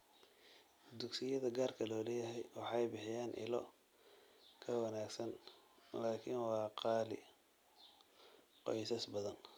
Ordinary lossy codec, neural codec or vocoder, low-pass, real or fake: none; none; none; real